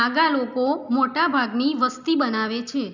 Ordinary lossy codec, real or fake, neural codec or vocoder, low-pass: none; real; none; 7.2 kHz